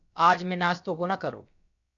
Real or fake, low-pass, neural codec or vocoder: fake; 7.2 kHz; codec, 16 kHz, about 1 kbps, DyCAST, with the encoder's durations